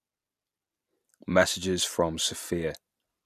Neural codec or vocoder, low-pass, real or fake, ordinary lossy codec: none; 14.4 kHz; real; none